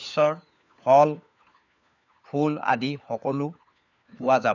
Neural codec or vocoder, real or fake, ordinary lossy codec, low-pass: codec, 16 kHz, 4 kbps, FunCodec, trained on LibriTTS, 50 frames a second; fake; none; 7.2 kHz